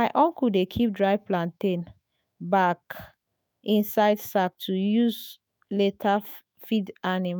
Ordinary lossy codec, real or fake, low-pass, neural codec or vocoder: none; fake; none; autoencoder, 48 kHz, 128 numbers a frame, DAC-VAE, trained on Japanese speech